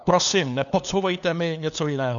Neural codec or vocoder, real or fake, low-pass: codec, 16 kHz, 2 kbps, FunCodec, trained on LibriTTS, 25 frames a second; fake; 7.2 kHz